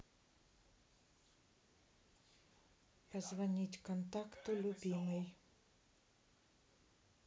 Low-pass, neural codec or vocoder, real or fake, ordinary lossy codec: none; none; real; none